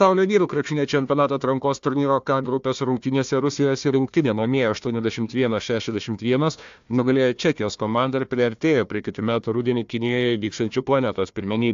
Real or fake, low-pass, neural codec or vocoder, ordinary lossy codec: fake; 7.2 kHz; codec, 16 kHz, 1 kbps, FunCodec, trained on Chinese and English, 50 frames a second; AAC, 64 kbps